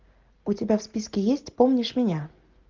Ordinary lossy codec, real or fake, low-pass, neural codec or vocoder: Opus, 24 kbps; real; 7.2 kHz; none